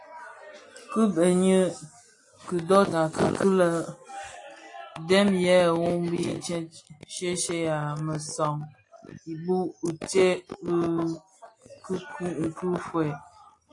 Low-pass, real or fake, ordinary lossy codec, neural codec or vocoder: 10.8 kHz; real; AAC, 48 kbps; none